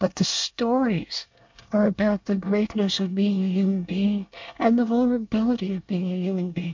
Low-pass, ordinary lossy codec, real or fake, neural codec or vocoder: 7.2 kHz; MP3, 48 kbps; fake; codec, 24 kHz, 1 kbps, SNAC